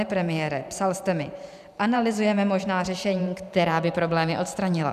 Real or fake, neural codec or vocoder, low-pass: fake; vocoder, 44.1 kHz, 128 mel bands every 512 samples, BigVGAN v2; 14.4 kHz